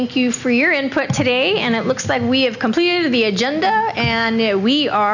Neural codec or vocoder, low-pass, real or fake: none; 7.2 kHz; real